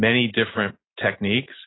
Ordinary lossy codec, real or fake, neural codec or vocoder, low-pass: AAC, 16 kbps; real; none; 7.2 kHz